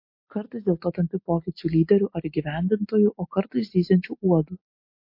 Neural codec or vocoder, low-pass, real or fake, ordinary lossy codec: none; 5.4 kHz; real; MP3, 32 kbps